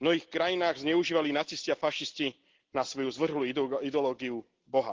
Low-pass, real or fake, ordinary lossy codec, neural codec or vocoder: 7.2 kHz; real; Opus, 16 kbps; none